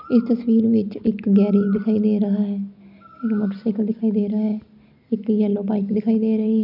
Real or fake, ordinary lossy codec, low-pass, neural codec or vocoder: real; none; 5.4 kHz; none